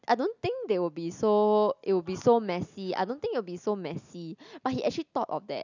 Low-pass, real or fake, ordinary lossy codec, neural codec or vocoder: 7.2 kHz; real; none; none